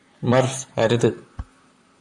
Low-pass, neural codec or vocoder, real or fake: 10.8 kHz; vocoder, 44.1 kHz, 128 mel bands, Pupu-Vocoder; fake